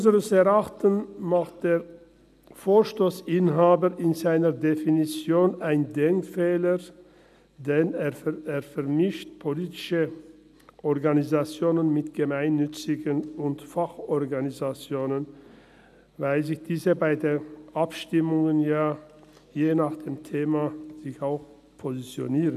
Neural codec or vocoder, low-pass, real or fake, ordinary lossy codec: none; 14.4 kHz; real; none